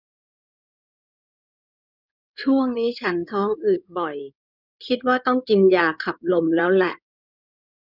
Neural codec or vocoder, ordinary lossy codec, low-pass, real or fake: codec, 16 kHz in and 24 kHz out, 2.2 kbps, FireRedTTS-2 codec; none; 5.4 kHz; fake